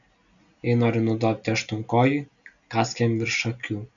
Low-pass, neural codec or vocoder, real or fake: 7.2 kHz; none; real